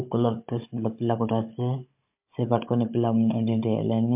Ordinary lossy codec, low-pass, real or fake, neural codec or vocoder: MP3, 32 kbps; 3.6 kHz; fake; codec, 16 kHz, 16 kbps, FunCodec, trained on Chinese and English, 50 frames a second